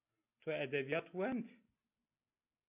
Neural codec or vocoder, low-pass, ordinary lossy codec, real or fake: none; 3.6 kHz; AAC, 24 kbps; real